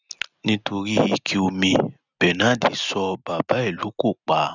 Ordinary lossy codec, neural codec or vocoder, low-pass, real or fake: none; none; 7.2 kHz; real